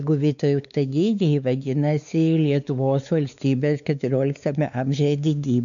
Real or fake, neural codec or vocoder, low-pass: fake; codec, 16 kHz, 4 kbps, X-Codec, WavLM features, trained on Multilingual LibriSpeech; 7.2 kHz